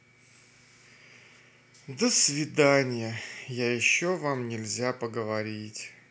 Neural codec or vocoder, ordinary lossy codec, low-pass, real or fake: none; none; none; real